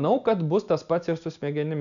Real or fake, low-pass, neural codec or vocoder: real; 7.2 kHz; none